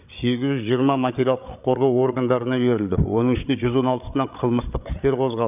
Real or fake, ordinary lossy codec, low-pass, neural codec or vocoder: fake; none; 3.6 kHz; codec, 16 kHz, 8 kbps, FreqCodec, larger model